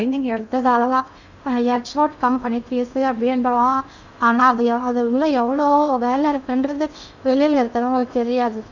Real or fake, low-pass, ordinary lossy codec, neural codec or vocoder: fake; 7.2 kHz; none; codec, 16 kHz in and 24 kHz out, 0.6 kbps, FocalCodec, streaming, 2048 codes